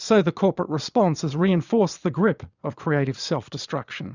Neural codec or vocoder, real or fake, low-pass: vocoder, 22.05 kHz, 80 mel bands, Vocos; fake; 7.2 kHz